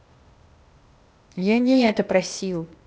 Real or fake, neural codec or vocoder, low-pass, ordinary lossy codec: fake; codec, 16 kHz, 0.8 kbps, ZipCodec; none; none